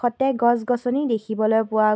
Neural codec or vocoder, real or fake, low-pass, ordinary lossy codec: none; real; none; none